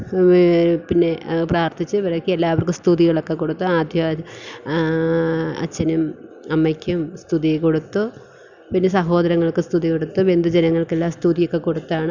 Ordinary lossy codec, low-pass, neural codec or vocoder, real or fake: none; 7.2 kHz; none; real